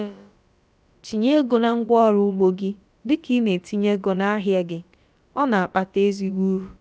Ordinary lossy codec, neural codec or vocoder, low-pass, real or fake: none; codec, 16 kHz, about 1 kbps, DyCAST, with the encoder's durations; none; fake